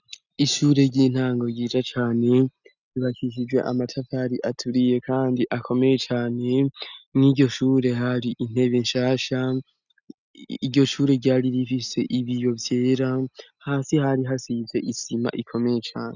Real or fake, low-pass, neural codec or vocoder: real; 7.2 kHz; none